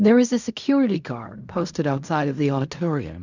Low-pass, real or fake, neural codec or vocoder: 7.2 kHz; fake; codec, 16 kHz in and 24 kHz out, 0.4 kbps, LongCat-Audio-Codec, fine tuned four codebook decoder